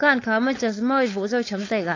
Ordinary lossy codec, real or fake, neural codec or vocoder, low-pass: AAC, 32 kbps; fake; codec, 16 kHz, 4.8 kbps, FACodec; 7.2 kHz